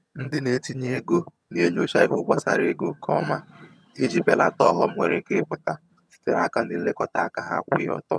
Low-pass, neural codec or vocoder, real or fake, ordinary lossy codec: none; vocoder, 22.05 kHz, 80 mel bands, HiFi-GAN; fake; none